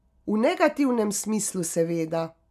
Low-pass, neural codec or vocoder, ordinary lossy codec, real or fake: 14.4 kHz; none; AAC, 96 kbps; real